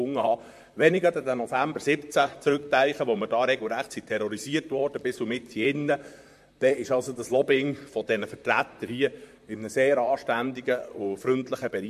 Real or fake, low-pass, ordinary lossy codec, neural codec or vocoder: fake; 14.4 kHz; MP3, 64 kbps; vocoder, 44.1 kHz, 128 mel bands, Pupu-Vocoder